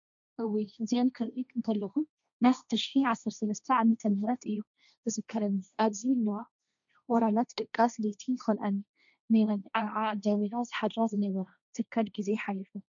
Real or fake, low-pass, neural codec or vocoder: fake; 7.2 kHz; codec, 16 kHz, 1.1 kbps, Voila-Tokenizer